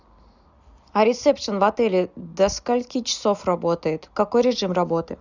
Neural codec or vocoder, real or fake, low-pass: none; real; 7.2 kHz